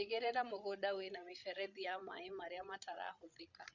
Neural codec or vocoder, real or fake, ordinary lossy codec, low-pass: codec, 16 kHz, 16 kbps, FreqCodec, larger model; fake; none; 7.2 kHz